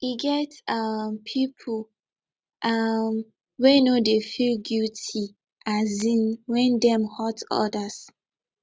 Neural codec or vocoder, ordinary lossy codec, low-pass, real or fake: none; none; none; real